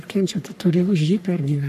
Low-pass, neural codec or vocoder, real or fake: 14.4 kHz; codec, 44.1 kHz, 3.4 kbps, Pupu-Codec; fake